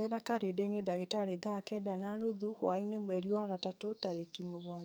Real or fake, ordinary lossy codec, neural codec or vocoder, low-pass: fake; none; codec, 44.1 kHz, 2.6 kbps, SNAC; none